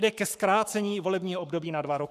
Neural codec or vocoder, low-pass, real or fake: codec, 44.1 kHz, 7.8 kbps, Pupu-Codec; 14.4 kHz; fake